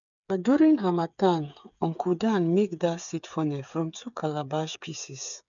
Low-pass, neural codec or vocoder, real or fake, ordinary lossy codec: 7.2 kHz; codec, 16 kHz, 8 kbps, FreqCodec, smaller model; fake; none